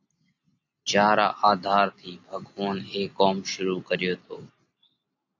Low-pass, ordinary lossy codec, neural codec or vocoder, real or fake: 7.2 kHz; AAC, 48 kbps; none; real